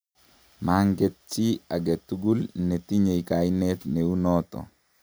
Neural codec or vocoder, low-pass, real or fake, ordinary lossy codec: none; none; real; none